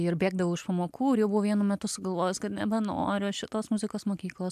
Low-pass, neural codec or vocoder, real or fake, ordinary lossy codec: 14.4 kHz; none; real; AAC, 96 kbps